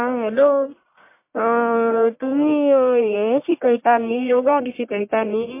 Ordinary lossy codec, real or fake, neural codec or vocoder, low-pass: MP3, 24 kbps; fake; codec, 44.1 kHz, 1.7 kbps, Pupu-Codec; 3.6 kHz